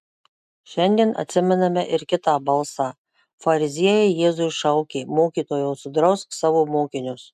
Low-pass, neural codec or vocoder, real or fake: 14.4 kHz; none; real